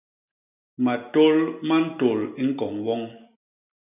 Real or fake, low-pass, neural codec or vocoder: real; 3.6 kHz; none